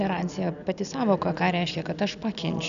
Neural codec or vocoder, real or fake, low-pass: none; real; 7.2 kHz